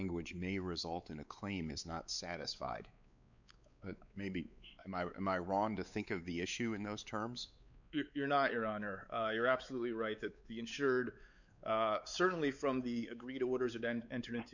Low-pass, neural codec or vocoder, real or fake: 7.2 kHz; codec, 16 kHz, 4 kbps, X-Codec, WavLM features, trained on Multilingual LibriSpeech; fake